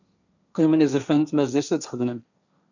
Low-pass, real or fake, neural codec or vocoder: 7.2 kHz; fake; codec, 16 kHz, 1.1 kbps, Voila-Tokenizer